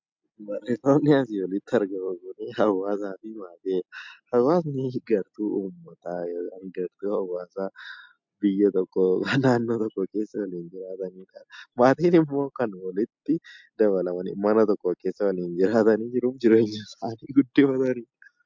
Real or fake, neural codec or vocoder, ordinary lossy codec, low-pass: real; none; MP3, 64 kbps; 7.2 kHz